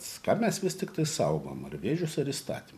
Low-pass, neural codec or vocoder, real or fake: 14.4 kHz; vocoder, 44.1 kHz, 128 mel bands every 256 samples, BigVGAN v2; fake